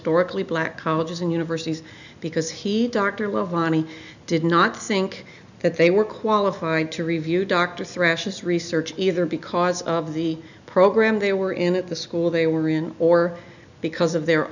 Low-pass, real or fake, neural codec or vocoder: 7.2 kHz; real; none